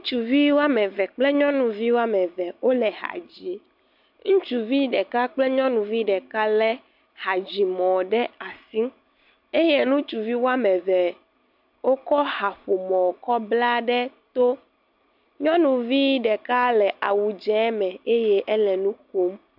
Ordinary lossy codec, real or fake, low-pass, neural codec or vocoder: MP3, 48 kbps; real; 5.4 kHz; none